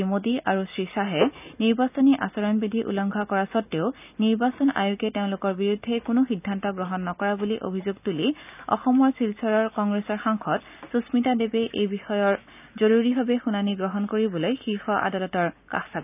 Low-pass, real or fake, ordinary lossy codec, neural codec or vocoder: 3.6 kHz; real; none; none